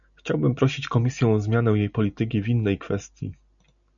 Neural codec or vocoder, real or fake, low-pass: none; real; 7.2 kHz